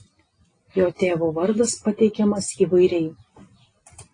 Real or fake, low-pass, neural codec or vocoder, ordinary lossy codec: real; 9.9 kHz; none; AAC, 32 kbps